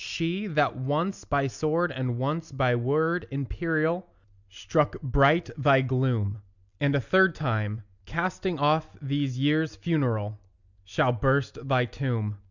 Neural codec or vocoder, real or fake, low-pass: none; real; 7.2 kHz